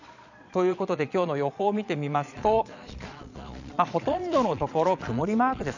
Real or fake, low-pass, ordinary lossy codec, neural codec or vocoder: fake; 7.2 kHz; none; vocoder, 22.05 kHz, 80 mel bands, Vocos